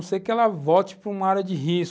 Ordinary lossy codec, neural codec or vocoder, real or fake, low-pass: none; none; real; none